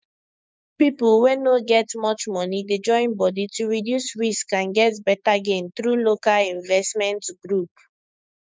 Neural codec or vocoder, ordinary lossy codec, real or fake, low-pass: codec, 16 kHz, 6 kbps, DAC; none; fake; none